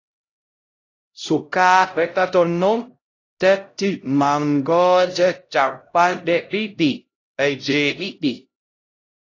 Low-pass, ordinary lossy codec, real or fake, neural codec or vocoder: 7.2 kHz; AAC, 32 kbps; fake; codec, 16 kHz, 0.5 kbps, X-Codec, HuBERT features, trained on LibriSpeech